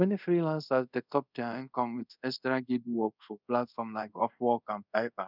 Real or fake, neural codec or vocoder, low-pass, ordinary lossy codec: fake; codec, 24 kHz, 0.5 kbps, DualCodec; 5.4 kHz; none